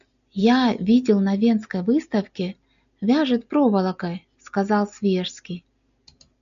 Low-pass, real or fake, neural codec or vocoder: 7.2 kHz; real; none